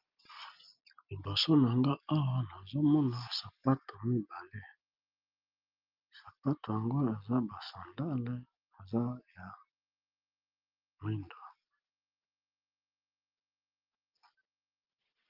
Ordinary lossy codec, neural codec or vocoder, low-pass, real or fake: Opus, 24 kbps; none; 5.4 kHz; real